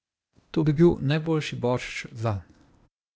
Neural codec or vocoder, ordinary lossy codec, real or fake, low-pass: codec, 16 kHz, 0.8 kbps, ZipCodec; none; fake; none